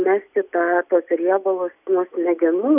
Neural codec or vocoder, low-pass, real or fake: none; 3.6 kHz; real